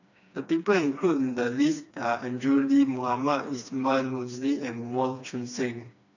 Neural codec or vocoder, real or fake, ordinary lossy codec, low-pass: codec, 16 kHz, 2 kbps, FreqCodec, smaller model; fake; none; 7.2 kHz